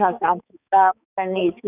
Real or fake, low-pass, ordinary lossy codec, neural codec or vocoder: fake; 3.6 kHz; none; codec, 16 kHz, 4 kbps, X-Codec, HuBERT features, trained on balanced general audio